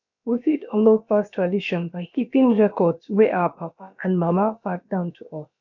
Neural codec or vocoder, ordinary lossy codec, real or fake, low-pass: codec, 16 kHz, about 1 kbps, DyCAST, with the encoder's durations; none; fake; 7.2 kHz